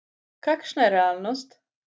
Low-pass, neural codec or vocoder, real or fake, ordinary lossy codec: none; none; real; none